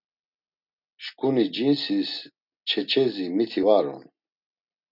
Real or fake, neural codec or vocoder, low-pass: real; none; 5.4 kHz